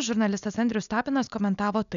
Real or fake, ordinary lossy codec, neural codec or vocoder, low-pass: real; MP3, 96 kbps; none; 7.2 kHz